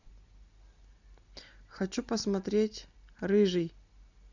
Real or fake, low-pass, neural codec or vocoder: real; 7.2 kHz; none